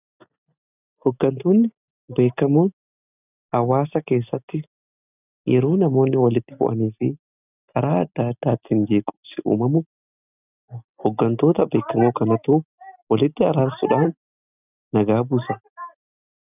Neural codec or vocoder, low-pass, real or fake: none; 3.6 kHz; real